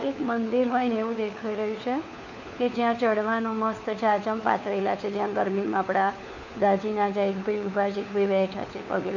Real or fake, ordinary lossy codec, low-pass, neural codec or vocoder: fake; none; 7.2 kHz; codec, 16 kHz, 4 kbps, FunCodec, trained on LibriTTS, 50 frames a second